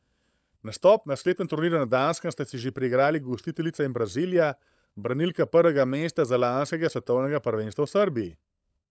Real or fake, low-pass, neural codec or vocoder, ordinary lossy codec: fake; none; codec, 16 kHz, 16 kbps, FunCodec, trained on LibriTTS, 50 frames a second; none